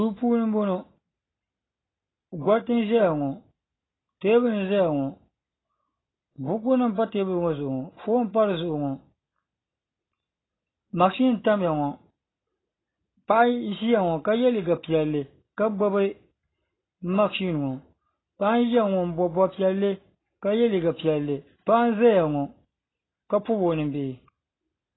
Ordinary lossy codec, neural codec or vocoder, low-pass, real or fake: AAC, 16 kbps; none; 7.2 kHz; real